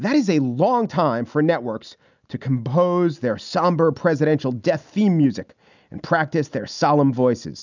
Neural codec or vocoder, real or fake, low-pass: none; real; 7.2 kHz